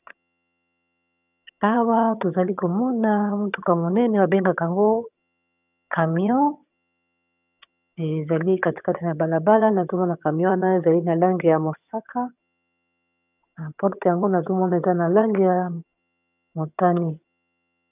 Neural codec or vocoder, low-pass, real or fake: vocoder, 22.05 kHz, 80 mel bands, HiFi-GAN; 3.6 kHz; fake